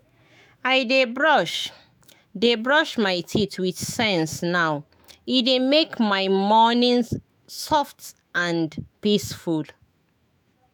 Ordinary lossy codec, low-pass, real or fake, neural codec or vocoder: none; none; fake; autoencoder, 48 kHz, 128 numbers a frame, DAC-VAE, trained on Japanese speech